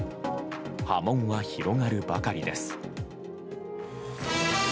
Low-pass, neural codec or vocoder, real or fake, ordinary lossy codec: none; none; real; none